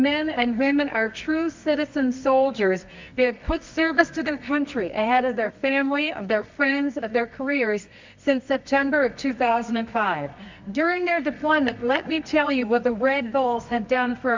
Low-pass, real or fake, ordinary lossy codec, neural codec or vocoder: 7.2 kHz; fake; MP3, 64 kbps; codec, 24 kHz, 0.9 kbps, WavTokenizer, medium music audio release